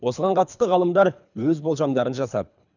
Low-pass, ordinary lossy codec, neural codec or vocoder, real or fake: 7.2 kHz; none; codec, 24 kHz, 3 kbps, HILCodec; fake